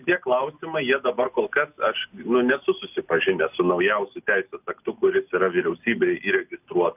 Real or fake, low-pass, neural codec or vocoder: real; 3.6 kHz; none